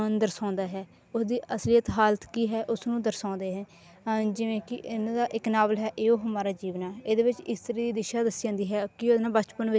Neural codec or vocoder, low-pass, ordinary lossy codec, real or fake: none; none; none; real